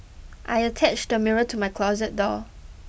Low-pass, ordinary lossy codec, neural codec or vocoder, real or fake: none; none; none; real